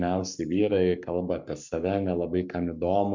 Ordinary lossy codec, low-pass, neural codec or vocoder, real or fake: MP3, 64 kbps; 7.2 kHz; codec, 44.1 kHz, 7.8 kbps, Pupu-Codec; fake